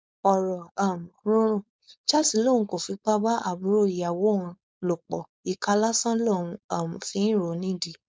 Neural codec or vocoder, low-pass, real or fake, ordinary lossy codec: codec, 16 kHz, 4.8 kbps, FACodec; none; fake; none